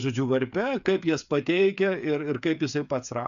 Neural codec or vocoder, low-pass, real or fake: codec, 16 kHz, 8 kbps, FreqCodec, smaller model; 7.2 kHz; fake